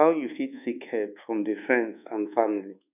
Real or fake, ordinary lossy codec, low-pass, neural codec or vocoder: fake; none; 3.6 kHz; codec, 24 kHz, 1.2 kbps, DualCodec